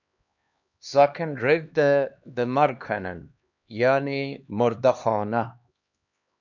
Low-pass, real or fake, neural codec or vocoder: 7.2 kHz; fake; codec, 16 kHz, 2 kbps, X-Codec, HuBERT features, trained on LibriSpeech